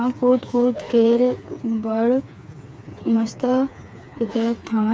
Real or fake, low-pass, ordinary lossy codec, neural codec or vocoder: fake; none; none; codec, 16 kHz, 4 kbps, FreqCodec, smaller model